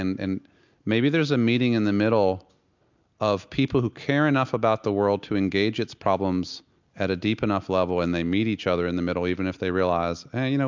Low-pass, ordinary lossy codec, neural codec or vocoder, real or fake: 7.2 kHz; MP3, 64 kbps; none; real